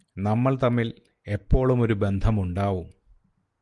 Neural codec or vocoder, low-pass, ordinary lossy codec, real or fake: none; 10.8 kHz; Opus, 24 kbps; real